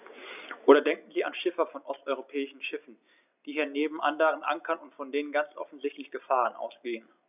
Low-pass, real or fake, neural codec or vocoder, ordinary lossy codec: 3.6 kHz; real; none; none